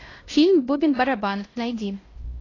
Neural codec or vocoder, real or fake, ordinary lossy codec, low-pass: codec, 16 kHz, 1 kbps, X-Codec, HuBERT features, trained on LibriSpeech; fake; AAC, 32 kbps; 7.2 kHz